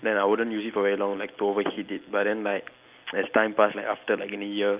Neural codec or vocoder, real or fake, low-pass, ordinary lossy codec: none; real; 3.6 kHz; Opus, 24 kbps